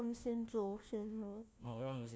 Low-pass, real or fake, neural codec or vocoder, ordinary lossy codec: none; fake; codec, 16 kHz, 1 kbps, FunCodec, trained on LibriTTS, 50 frames a second; none